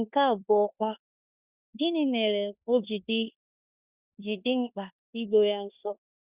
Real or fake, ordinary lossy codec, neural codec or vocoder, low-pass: fake; Opus, 64 kbps; codec, 16 kHz in and 24 kHz out, 0.9 kbps, LongCat-Audio-Codec, four codebook decoder; 3.6 kHz